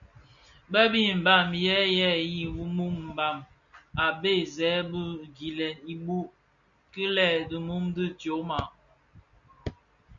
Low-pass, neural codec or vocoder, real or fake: 7.2 kHz; none; real